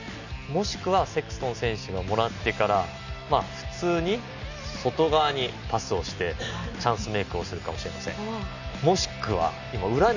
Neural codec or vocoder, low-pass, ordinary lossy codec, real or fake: none; 7.2 kHz; none; real